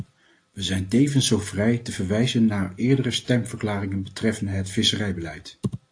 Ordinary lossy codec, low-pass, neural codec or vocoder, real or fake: AAC, 48 kbps; 9.9 kHz; none; real